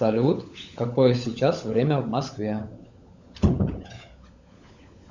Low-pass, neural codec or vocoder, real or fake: 7.2 kHz; codec, 16 kHz, 16 kbps, FunCodec, trained on LibriTTS, 50 frames a second; fake